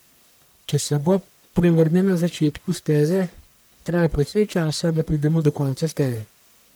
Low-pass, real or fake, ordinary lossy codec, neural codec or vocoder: none; fake; none; codec, 44.1 kHz, 1.7 kbps, Pupu-Codec